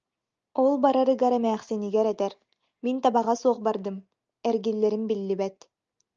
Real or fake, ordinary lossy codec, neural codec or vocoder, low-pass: real; Opus, 24 kbps; none; 7.2 kHz